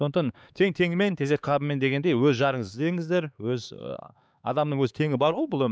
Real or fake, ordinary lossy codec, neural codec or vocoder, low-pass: fake; none; codec, 16 kHz, 4 kbps, X-Codec, HuBERT features, trained on LibriSpeech; none